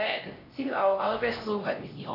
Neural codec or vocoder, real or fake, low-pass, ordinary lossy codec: codec, 16 kHz, 1 kbps, X-Codec, HuBERT features, trained on LibriSpeech; fake; 5.4 kHz; none